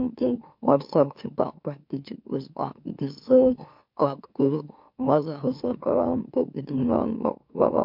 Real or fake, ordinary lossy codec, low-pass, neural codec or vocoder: fake; MP3, 48 kbps; 5.4 kHz; autoencoder, 44.1 kHz, a latent of 192 numbers a frame, MeloTTS